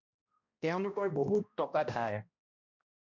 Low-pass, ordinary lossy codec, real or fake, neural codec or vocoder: 7.2 kHz; MP3, 48 kbps; fake; codec, 16 kHz, 1 kbps, X-Codec, HuBERT features, trained on balanced general audio